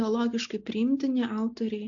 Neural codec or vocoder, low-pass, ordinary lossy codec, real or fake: none; 7.2 kHz; AAC, 64 kbps; real